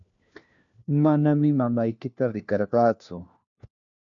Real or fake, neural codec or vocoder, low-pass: fake; codec, 16 kHz, 1 kbps, FunCodec, trained on LibriTTS, 50 frames a second; 7.2 kHz